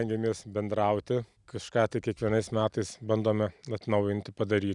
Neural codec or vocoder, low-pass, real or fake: none; 10.8 kHz; real